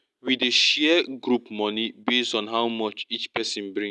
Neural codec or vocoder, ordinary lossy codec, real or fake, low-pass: none; none; real; none